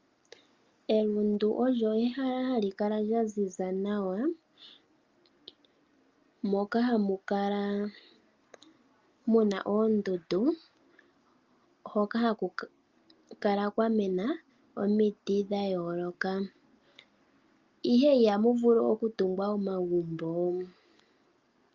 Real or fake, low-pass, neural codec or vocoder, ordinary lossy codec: real; 7.2 kHz; none; Opus, 32 kbps